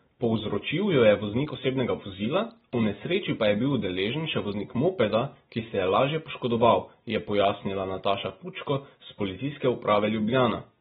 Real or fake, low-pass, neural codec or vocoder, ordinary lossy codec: real; 19.8 kHz; none; AAC, 16 kbps